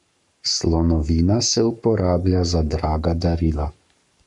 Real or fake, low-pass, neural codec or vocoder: fake; 10.8 kHz; codec, 44.1 kHz, 7.8 kbps, Pupu-Codec